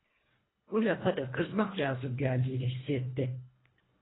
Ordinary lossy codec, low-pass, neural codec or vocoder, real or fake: AAC, 16 kbps; 7.2 kHz; codec, 24 kHz, 1.5 kbps, HILCodec; fake